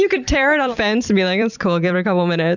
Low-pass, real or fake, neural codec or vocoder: 7.2 kHz; fake; vocoder, 44.1 kHz, 128 mel bands every 256 samples, BigVGAN v2